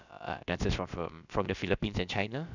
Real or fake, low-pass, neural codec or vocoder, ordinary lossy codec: fake; 7.2 kHz; codec, 16 kHz, about 1 kbps, DyCAST, with the encoder's durations; none